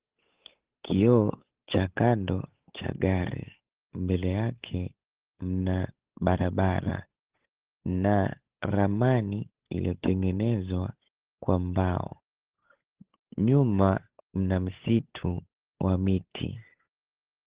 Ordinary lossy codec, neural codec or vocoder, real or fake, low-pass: Opus, 16 kbps; codec, 16 kHz, 8 kbps, FunCodec, trained on Chinese and English, 25 frames a second; fake; 3.6 kHz